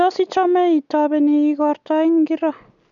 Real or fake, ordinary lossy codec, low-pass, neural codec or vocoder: real; none; 7.2 kHz; none